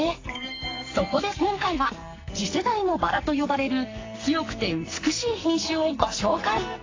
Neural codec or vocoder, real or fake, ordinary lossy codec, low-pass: codec, 44.1 kHz, 2.6 kbps, SNAC; fake; AAC, 32 kbps; 7.2 kHz